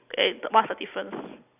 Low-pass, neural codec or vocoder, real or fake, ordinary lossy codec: 3.6 kHz; none; real; none